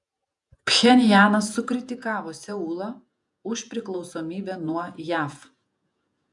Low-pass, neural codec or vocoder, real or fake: 10.8 kHz; vocoder, 48 kHz, 128 mel bands, Vocos; fake